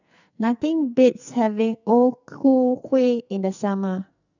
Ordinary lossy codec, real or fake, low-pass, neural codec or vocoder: none; fake; 7.2 kHz; codec, 44.1 kHz, 2.6 kbps, SNAC